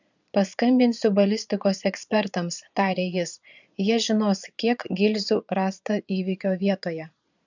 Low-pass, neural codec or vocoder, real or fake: 7.2 kHz; vocoder, 22.05 kHz, 80 mel bands, Vocos; fake